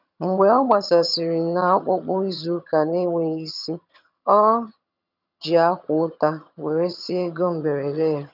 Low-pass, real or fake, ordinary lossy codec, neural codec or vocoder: 5.4 kHz; fake; none; vocoder, 22.05 kHz, 80 mel bands, HiFi-GAN